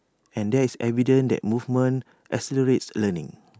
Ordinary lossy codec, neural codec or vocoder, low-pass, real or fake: none; none; none; real